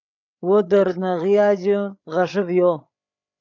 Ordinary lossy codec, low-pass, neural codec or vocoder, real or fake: AAC, 48 kbps; 7.2 kHz; codec, 16 kHz, 8 kbps, FreqCodec, larger model; fake